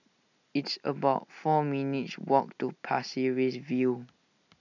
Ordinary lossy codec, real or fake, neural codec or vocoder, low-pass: none; real; none; 7.2 kHz